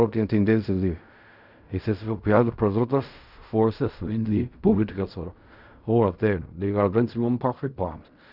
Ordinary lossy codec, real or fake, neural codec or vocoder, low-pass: none; fake; codec, 16 kHz in and 24 kHz out, 0.4 kbps, LongCat-Audio-Codec, fine tuned four codebook decoder; 5.4 kHz